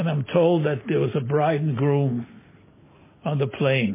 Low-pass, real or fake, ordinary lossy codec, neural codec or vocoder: 3.6 kHz; real; MP3, 16 kbps; none